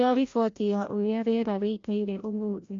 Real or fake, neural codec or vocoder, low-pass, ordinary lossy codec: fake; codec, 16 kHz, 0.5 kbps, FreqCodec, larger model; 7.2 kHz; AAC, 48 kbps